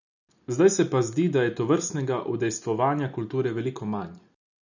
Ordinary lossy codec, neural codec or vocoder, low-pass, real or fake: none; none; 7.2 kHz; real